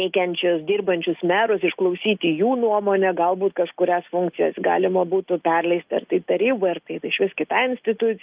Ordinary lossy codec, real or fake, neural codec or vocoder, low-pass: Opus, 32 kbps; real; none; 3.6 kHz